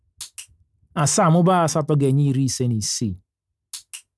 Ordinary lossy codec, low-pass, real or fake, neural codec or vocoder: none; none; real; none